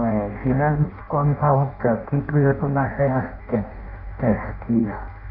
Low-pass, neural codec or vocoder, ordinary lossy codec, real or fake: 5.4 kHz; codec, 16 kHz in and 24 kHz out, 0.6 kbps, FireRedTTS-2 codec; none; fake